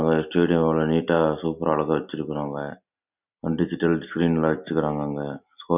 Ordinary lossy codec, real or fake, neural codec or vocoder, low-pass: none; real; none; 3.6 kHz